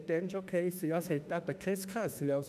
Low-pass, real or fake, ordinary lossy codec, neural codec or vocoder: 14.4 kHz; fake; none; autoencoder, 48 kHz, 32 numbers a frame, DAC-VAE, trained on Japanese speech